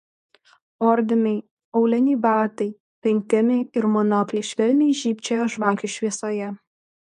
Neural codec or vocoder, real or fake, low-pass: codec, 24 kHz, 0.9 kbps, WavTokenizer, medium speech release version 2; fake; 10.8 kHz